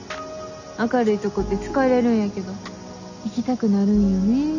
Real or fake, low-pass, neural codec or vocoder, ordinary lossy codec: real; 7.2 kHz; none; none